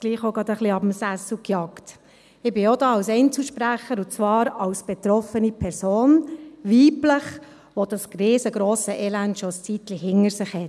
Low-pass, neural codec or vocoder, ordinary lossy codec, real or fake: none; none; none; real